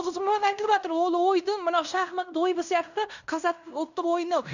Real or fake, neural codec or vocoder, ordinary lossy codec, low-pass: fake; codec, 16 kHz in and 24 kHz out, 0.9 kbps, LongCat-Audio-Codec, fine tuned four codebook decoder; none; 7.2 kHz